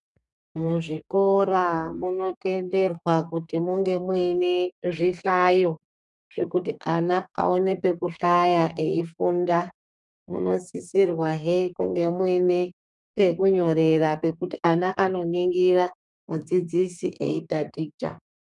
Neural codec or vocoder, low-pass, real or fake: codec, 32 kHz, 1.9 kbps, SNAC; 10.8 kHz; fake